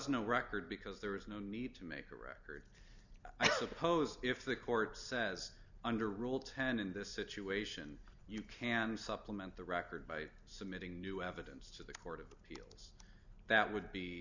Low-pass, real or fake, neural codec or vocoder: 7.2 kHz; real; none